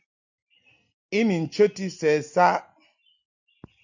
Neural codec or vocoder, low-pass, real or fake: none; 7.2 kHz; real